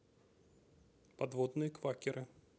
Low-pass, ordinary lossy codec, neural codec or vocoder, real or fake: none; none; none; real